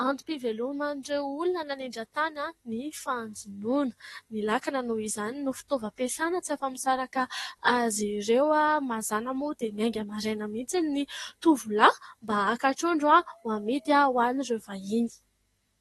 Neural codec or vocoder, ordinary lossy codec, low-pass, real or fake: codec, 44.1 kHz, 7.8 kbps, DAC; AAC, 32 kbps; 19.8 kHz; fake